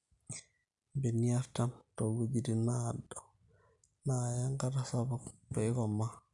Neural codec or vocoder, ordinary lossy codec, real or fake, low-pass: none; none; real; 10.8 kHz